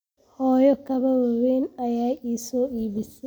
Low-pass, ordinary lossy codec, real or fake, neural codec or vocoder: none; none; real; none